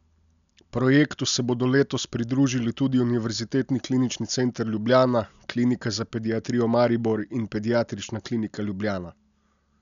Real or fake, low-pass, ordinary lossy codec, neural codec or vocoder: real; 7.2 kHz; none; none